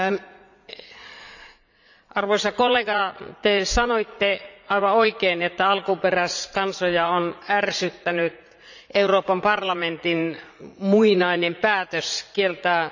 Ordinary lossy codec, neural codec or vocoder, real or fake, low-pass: none; vocoder, 44.1 kHz, 80 mel bands, Vocos; fake; 7.2 kHz